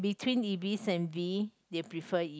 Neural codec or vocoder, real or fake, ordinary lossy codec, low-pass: none; real; none; none